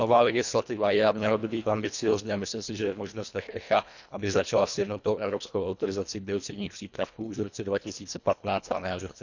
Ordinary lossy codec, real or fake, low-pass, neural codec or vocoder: none; fake; 7.2 kHz; codec, 24 kHz, 1.5 kbps, HILCodec